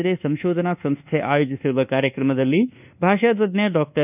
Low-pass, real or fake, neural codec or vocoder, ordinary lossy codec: 3.6 kHz; fake; codec, 24 kHz, 1.2 kbps, DualCodec; none